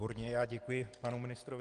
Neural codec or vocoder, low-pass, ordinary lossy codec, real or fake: none; 9.9 kHz; Opus, 24 kbps; real